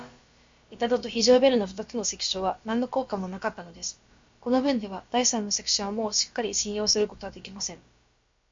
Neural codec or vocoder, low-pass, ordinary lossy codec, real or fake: codec, 16 kHz, about 1 kbps, DyCAST, with the encoder's durations; 7.2 kHz; MP3, 48 kbps; fake